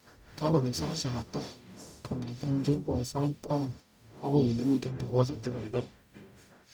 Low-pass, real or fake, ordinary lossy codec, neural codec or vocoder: none; fake; none; codec, 44.1 kHz, 0.9 kbps, DAC